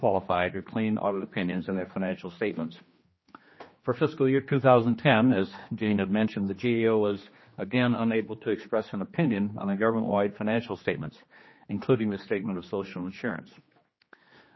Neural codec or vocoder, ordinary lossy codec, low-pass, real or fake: codec, 16 kHz, 2 kbps, X-Codec, HuBERT features, trained on general audio; MP3, 24 kbps; 7.2 kHz; fake